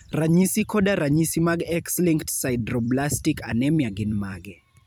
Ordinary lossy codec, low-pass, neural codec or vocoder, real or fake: none; none; vocoder, 44.1 kHz, 128 mel bands every 256 samples, BigVGAN v2; fake